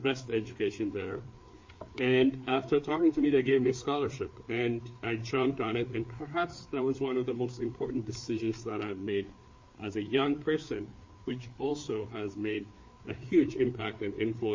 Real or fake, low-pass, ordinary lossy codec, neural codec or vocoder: fake; 7.2 kHz; MP3, 32 kbps; codec, 16 kHz, 4 kbps, FreqCodec, larger model